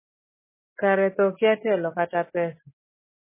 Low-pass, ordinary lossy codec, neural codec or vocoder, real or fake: 3.6 kHz; MP3, 16 kbps; none; real